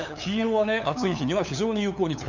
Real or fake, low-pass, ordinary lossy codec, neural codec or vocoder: fake; 7.2 kHz; none; codec, 16 kHz, 8 kbps, FunCodec, trained on LibriTTS, 25 frames a second